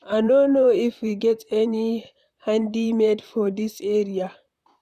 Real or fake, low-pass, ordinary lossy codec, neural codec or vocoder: fake; 14.4 kHz; none; vocoder, 44.1 kHz, 128 mel bands, Pupu-Vocoder